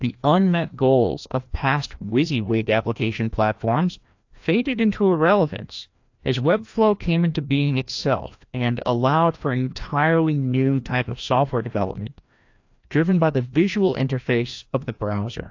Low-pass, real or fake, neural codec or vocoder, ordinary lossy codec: 7.2 kHz; fake; codec, 16 kHz, 1 kbps, FreqCodec, larger model; AAC, 48 kbps